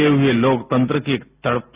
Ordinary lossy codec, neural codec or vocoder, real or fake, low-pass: Opus, 64 kbps; none; real; 3.6 kHz